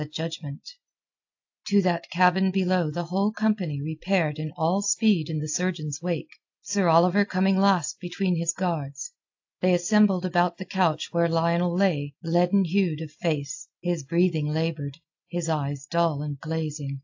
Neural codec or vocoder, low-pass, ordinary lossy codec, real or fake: none; 7.2 kHz; AAC, 48 kbps; real